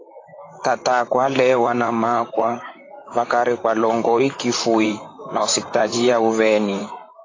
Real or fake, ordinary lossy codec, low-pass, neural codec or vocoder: fake; AAC, 32 kbps; 7.2 kHz; vocoder, 44.1 kHz, 128 mel bands, Pupu-Vocoder